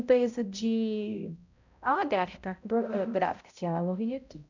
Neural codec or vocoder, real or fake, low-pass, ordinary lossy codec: codec, 16 kHz, 0.5 kbps, X-Codec, HuBERT features, trained on balanced general audio; fake; 7.2 kHz; none